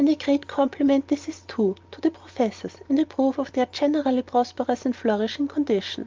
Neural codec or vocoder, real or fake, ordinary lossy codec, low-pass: none; real; Opus, 32 kbps; 7.2 kHz